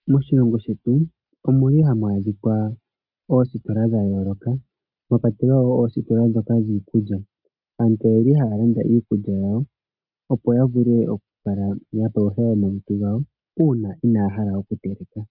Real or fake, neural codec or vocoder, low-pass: real; none; 5.4 kHz